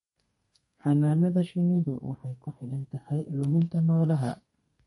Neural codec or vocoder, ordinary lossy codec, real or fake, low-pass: codec, 32 kHz, 1.9 kbps, SNAC; MP3, 48 kbps; fake; 14.4 kHz